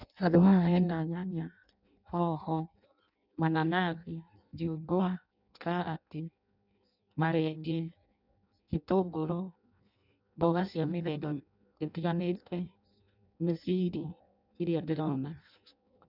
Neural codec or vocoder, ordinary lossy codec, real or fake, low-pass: codec, 16 kHz in and 24 kHz out, 0.6 kbps, FireRedTTS-2 codec; none; fake; 5.4 kHz